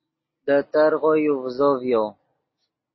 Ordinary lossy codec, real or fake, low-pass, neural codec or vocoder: MP3, 24 kbps; real; 7.2 kHz; none